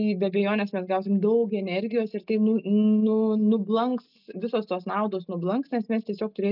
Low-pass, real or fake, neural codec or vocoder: 5.4 kHz; real; none